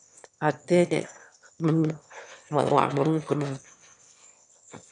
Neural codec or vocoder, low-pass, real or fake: autoencoder, 22.05 kHz, a latent of 192 numbers a frame, VITS, trained on one speaker; 9.9 kHz; fake